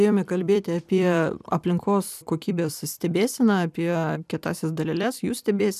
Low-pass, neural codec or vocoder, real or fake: 14.4 kHz; vocoder, 44.1 kHz, 128 mel bands every 256 samples, BigVGAN v2; fake